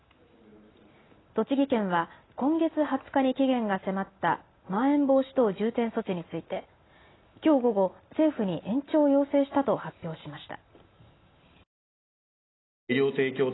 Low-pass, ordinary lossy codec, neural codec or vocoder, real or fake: 7.2 kHz; AAC, 16 kbps; none; real